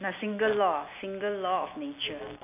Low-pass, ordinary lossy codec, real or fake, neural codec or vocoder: 3.6 kHz; none; real; none